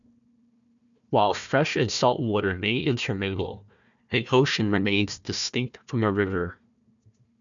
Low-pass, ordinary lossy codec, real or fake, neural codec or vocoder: 7.2 kHz; MP3, 96 kbps; fake; codec, 16 kHz, 1 kbps, FunCodec, trained on Chinese and English, 50 frames a second